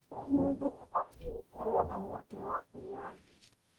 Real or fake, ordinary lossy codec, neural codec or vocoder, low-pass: fake; Opus, 24 kbps; codec, 44.1 kHz, 0.9 kbps, DAC; 19.8 kHz